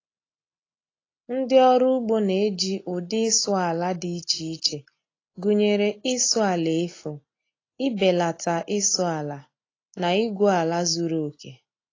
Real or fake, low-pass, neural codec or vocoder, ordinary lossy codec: real; 7.2 kHz; none; AAC, 32 kbps